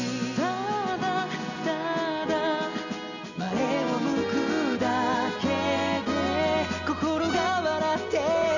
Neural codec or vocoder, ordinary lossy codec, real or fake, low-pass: none; none; real; 7.2 kHz